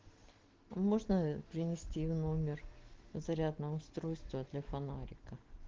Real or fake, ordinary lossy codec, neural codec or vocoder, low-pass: real; Opus, 16 kbps; none; 7.2 kHz